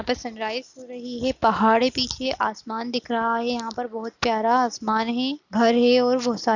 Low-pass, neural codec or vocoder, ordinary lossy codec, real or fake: 7.2 kHz; none; none; real